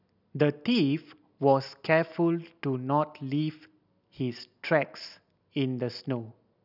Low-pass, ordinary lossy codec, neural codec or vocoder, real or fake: 5.4 kHz; none; none; real